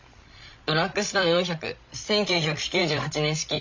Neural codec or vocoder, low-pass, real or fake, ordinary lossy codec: codec, 16 kHz, 16 kbps, FreqCodec, larger model; 7.2 kHz; fake; MP3, 48 kbps